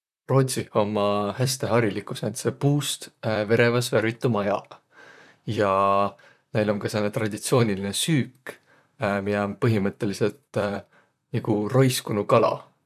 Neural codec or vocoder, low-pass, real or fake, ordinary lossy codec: vocoder, 44.1 kHz, 128 mel bands, Pupu-Vocoder; 14.4 kHz; fake; none